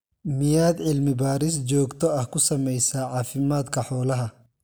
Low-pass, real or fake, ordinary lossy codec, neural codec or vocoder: none; real; none; none